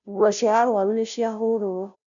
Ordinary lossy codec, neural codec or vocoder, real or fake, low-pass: none; codec, 16 kHz, 0.5 kbps, FunCodec, trained on Chinese and English, 25 frames a second; fake; 7.2 kHz